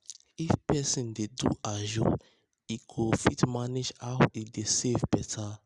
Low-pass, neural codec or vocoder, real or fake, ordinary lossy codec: 10.8 kHz; none; real; Opus, 64 kbps